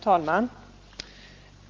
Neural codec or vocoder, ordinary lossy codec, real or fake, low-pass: none; Opus, 32 kbps; real; 7.2 kHz